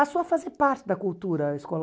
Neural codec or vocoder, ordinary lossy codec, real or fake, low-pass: none; none; real; none